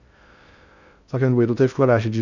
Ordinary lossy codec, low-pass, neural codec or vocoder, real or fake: none; 7.2 kHz; codec, 16 kHz in and 24 kHz out, 0.6 kbps, FocalCodec, streaming, 2048 codes; fake